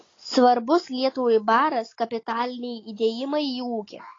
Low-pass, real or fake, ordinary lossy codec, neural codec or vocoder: 7.2 kHz; real; AAC, 32 kbps; none